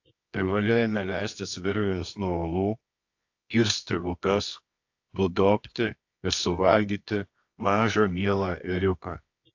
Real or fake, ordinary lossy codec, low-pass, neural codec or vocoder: fake; AAC, 48 kbps; 7.2 kHz; codec, 24 kHz, 0.9 kbps, WavTokenizer, medium music audio release